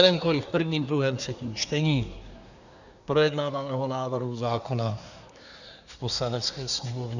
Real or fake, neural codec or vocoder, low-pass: fake; codec, 24 kHz, 1 kbps, SNAC; 7.2 kHz